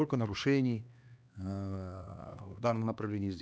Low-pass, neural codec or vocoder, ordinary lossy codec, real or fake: none; codec, 16 kHz, 2 kbps, X-Codec, HuBERT features, trained on LibriSpeech; none; fake